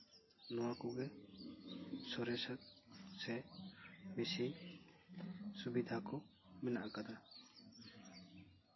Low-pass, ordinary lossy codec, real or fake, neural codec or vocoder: 7.2 kHz; MP3, 24 kbps; real; none